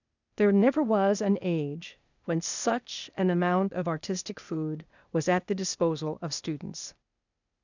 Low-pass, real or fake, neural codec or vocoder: 7.2 kHz; fake; codec, 16 kHz, 0.8 kbps, ZipCodec